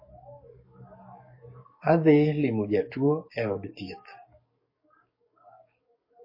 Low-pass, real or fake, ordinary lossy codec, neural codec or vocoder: 5.4 kHz; fake; MP3, 24 kbps; codec, 44.1 kHz, 7.8 kbps, DAC